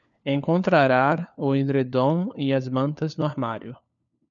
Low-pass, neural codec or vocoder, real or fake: 7.2 kHz; codec, 16 kHz, 4 kbps, FunCodec, trained on LibriTTS, 50 frames a second; fake